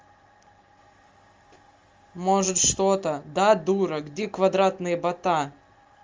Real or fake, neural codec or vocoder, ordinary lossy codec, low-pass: real; none; Opus, 32 kbps; 7.2 kHz